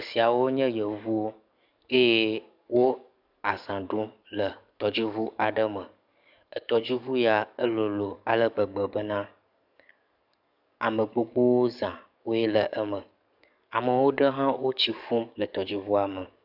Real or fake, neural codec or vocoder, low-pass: fake; codec, 44.1 kHz, 7.8 kbps, DAC; 5.4 kHz